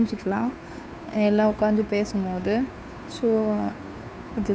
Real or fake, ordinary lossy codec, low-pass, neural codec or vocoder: fake; none; none; codec, 16 kHz, 2 kbps, FunCodec, trained on Chinese and English, 25 frames a second